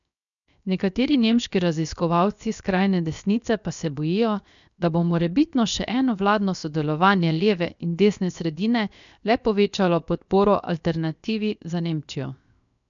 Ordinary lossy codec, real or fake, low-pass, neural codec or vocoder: none; fake; 7.2 kHz; codec, 16 kHz, 0.7 kbps, FocalCodec